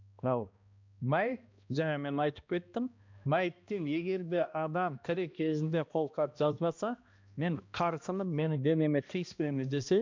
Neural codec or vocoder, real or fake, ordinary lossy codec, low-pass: codec, 16 kHz, 1 kbps, X-Codec, HuBERT features, trained on balanced general audio; fake; MP3, 64 kbps; 7.2 kHz